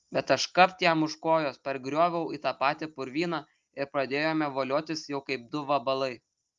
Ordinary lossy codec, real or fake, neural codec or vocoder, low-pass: Opus, 24 kbps; real; none; 7.2 kHz